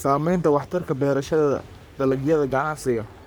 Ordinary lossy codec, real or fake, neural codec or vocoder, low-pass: none; fake; codec, 44.1 kHz, 3.4 kbps, Pupu-Codec; none